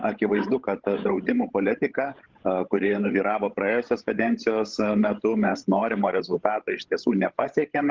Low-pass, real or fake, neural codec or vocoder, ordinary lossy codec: 7.2 kHz; fake; codec, 16 kHz, 16 kbps, FreqCodec, larger model; Opus, 16 kbps